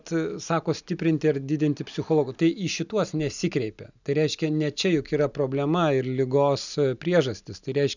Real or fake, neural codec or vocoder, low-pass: real; none; 7.2 kHz